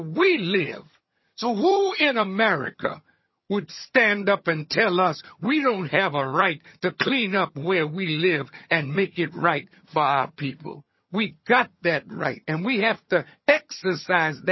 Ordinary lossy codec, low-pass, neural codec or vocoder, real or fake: MP3, 24 kbps; 7.2 kHz; vocoder, 22.05 kHz, 80 mel bands, HiFi-GAN; fake